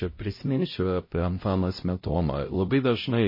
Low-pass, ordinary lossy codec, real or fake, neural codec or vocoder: 5.4 kHz; MP3, 24 kbps; fake; codec, 16 kHz, 0.5 kbps, X-Codec, HuBERT features, trained on LibriSpeech